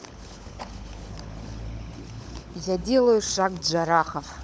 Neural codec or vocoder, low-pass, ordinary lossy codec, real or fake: codec, 16 kHz, 16 kbps, FunCodec, trained on LibriTTS, 50 frames a second; none; none; fake